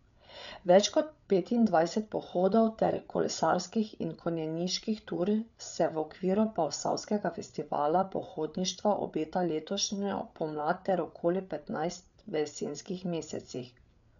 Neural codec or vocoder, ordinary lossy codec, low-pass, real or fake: codec, 16 kHz, 8 kbps, FreqCodec, larger model; none; 7.2 kHz; fake